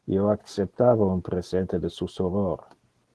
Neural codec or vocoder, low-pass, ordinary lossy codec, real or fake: none; 10.8 kHz; Opus, 16 kbps; real